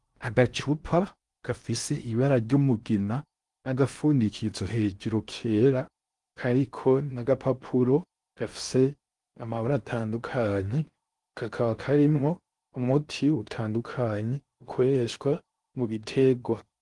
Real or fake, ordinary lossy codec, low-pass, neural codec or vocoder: fake; Opus, 24 kbps; 10.8 kHz; codec, 16 kHz in and 24 kHz out, 0.6 kbps, FocalCodec, streaming, 4096 codes